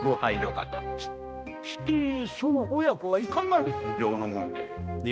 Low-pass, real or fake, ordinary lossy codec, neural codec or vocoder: none; fake; none; codec, 16 kHz, 1 kbps, X-Codec, HuBERT features, trained on balanced general audio